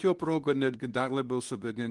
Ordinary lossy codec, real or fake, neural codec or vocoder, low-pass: Opus, 24 kbps; fake; codec, 24 kHz, 0.9 kbps, WavTokenizer, medium speech release version 2; 10.8 kHz